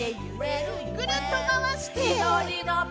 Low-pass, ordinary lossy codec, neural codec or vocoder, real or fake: none; none; none; real